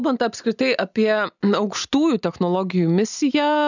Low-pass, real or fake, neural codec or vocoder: 7.2 kHz; real; none